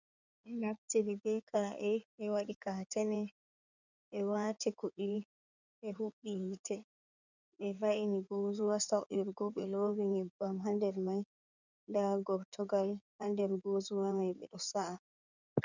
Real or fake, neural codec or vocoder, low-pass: fake; codec, 16 kHz in and 24 kHz out, 2.2 kbps, FireRedTTS-2 codec; 7.2 kHz